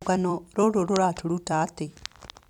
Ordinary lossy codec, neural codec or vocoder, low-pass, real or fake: none; vocoder, 44.1 kHz, 128 mel bands every 256 samples, BigVGAN v2; 19.8 kHz; fake